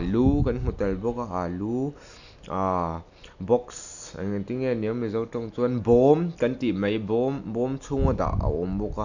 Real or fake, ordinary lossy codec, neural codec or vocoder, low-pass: real; none; none; 7.2 kHz